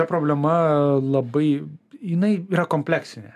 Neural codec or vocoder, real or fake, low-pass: none; real; 14.4 kHz